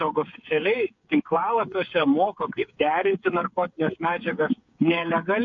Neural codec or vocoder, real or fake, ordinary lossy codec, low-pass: codec, 16 kHz, 8 kbps, FreqCodec, smaller model; fake; MP3, 48 kbps; 7.2 kHz